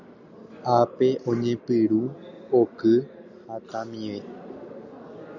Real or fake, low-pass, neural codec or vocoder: real; 7.2 kHz; none